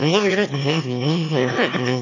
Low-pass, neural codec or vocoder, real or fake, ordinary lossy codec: 7.2 kHz; autoencoder, 22.05 kHz, a latent of 192 numbers a frame, VITS, trained on one speaker; fake; none